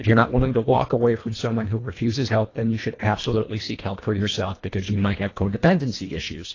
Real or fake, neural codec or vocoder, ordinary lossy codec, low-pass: fake; codec, 24 kHz, 1.5 kbps, HILCodec; AAC, 32 kbps; 7.2 kHz